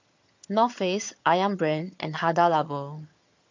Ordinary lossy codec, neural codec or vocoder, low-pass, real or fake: MP3, 48 kbps; vocoder, 22.05 kHz, 80 mel bands, HiFi-GAN; 7.2 kHz; fake